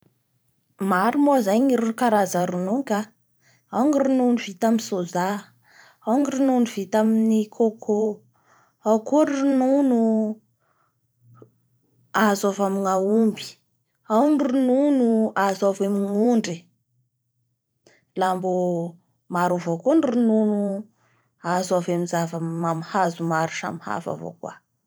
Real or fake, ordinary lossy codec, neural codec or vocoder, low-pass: fake; none; vocoder, 44.1 kHz, 128 mel bands every 512 samples, BigVGAN v2; none